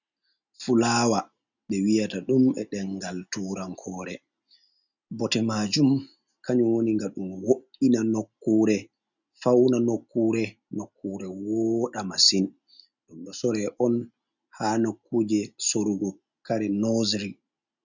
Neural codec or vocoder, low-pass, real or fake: none; 7.2 kHz; real